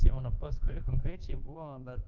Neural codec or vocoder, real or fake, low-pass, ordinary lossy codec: codec, 24 kHz, 1.2 kbps, DualCodec; fake; 7.2 kHz; Opus, 24 kbps